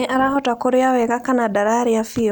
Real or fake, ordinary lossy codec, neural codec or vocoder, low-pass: real; none; none; none